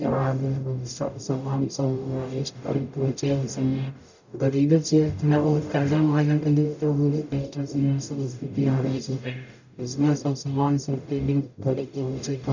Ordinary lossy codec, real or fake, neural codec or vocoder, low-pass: none; fake; codec, 44.1 kHz, 0.9 kbps, DAC; 7.2 kHz